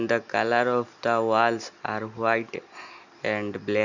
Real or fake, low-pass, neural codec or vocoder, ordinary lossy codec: real; 7.2 kHz; none; none